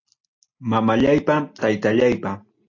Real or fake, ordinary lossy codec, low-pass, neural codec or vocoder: real; AAC, 48 kbps; 7.2 kHz; none